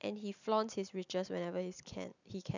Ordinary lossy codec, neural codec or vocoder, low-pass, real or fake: none; none; 7.2 kHz; real